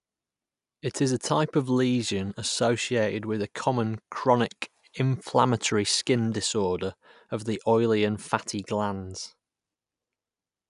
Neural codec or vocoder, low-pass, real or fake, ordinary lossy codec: none; 10.8 kHz; real; none